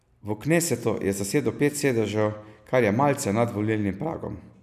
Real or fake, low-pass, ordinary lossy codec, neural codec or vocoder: real; 14.4 kHz; none; none